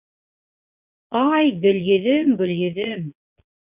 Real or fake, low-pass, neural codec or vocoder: fake; 3.6 kHz; vocoder, 22.05 kHz, 80 mel bands, Vocos